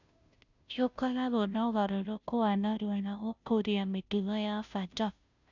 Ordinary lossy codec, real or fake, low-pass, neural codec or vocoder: none; fake; 7.2 kHz; codec, 16 kHz, 0.5 kbps, FunCodec, trained on Chinese and English, 25 frames a second